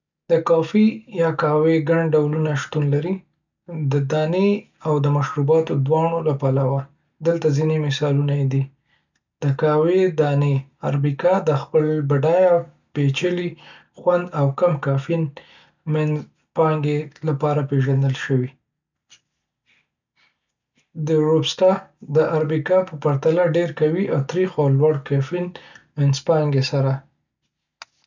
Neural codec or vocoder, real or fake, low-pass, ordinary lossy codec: none; real; 7.2 kHz; none